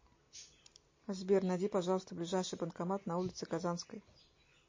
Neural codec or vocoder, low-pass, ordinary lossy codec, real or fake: none; 7.2 kHz; MP3, 32 kbps; real